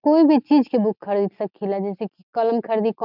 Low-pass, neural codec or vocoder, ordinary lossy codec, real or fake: 5.4 kHz; none; none; real